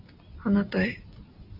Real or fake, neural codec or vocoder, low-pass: real; none; 5.4 kHz